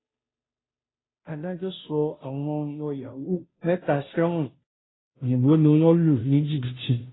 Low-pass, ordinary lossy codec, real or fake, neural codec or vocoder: 7.2 kHz; AAC, 16 kbps; fake; codec, 16 kHz, 0.5 kbps, FunCodec, trained on Chinese and English, 25 frames a second